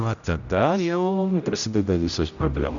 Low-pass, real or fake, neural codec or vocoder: 7.2 kHz; fake; codec, 16 kHz, 0.5 kbps, X-Codec, HuBERT features, trained on general audio